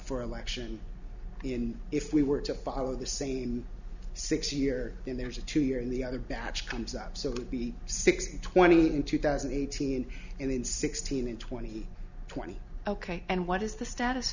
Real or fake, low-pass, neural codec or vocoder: real; 7.2 kHz; none